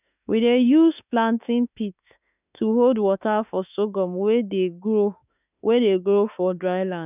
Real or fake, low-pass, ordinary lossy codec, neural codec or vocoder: fake; 3.6 kHz; none; autoencoder, 48 kHz, 32 numbers a frame, DAC-VAE, trained on Japanese speech